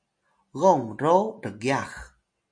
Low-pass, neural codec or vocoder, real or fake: 9.9 kHz; none; real